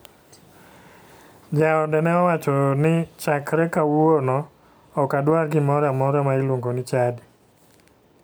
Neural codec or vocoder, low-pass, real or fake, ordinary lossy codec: none; none; real; none